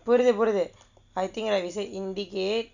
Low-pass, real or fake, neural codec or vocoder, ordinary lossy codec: 7.2 kHz; real; none; none